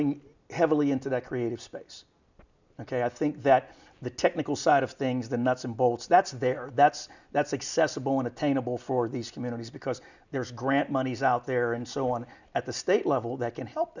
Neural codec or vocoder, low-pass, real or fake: none; 7.2 kHz; real